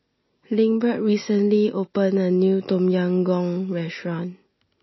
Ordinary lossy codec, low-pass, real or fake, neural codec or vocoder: MP3, 24 kbps; 7.2 kHz; real; none